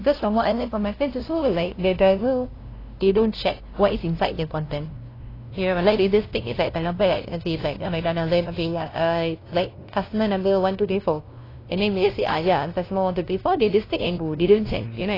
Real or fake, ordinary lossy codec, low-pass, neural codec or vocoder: fake; AAC, 24 kbps; 5.4 kHz; codec, 16 kHz, 0.5 kbps, FunCodec, trained on LibriTTS, 25 frames a second